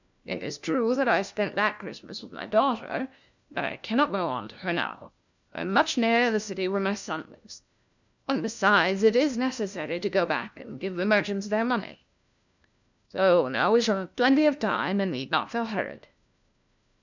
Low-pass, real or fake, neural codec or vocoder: 7.2 kHz; fake; codec, 16 kHz, 1 kbps, FunCodec, trained on LibriTTS, 50 frames a second